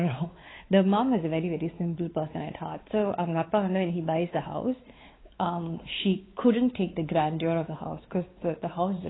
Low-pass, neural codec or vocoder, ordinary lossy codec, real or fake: 7.2 kHz; codec, 16 kHz, 4 kbps, X-Codec, WavLM features, trained on Multilingual LibriSpeech; AAC, 16 kbps; fake